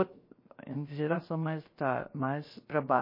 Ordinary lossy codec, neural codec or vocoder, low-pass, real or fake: MP3, 24 kbps; codec, 16 kHz, 0.7 kbps, FocalCodec; 5.4 kHz; fake